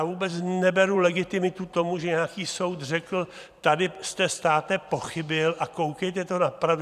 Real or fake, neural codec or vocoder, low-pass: fake; vocoder, 44.1 kHz, 128 mel bands every 512 samples, BigVGAN v2; 14.4 kHz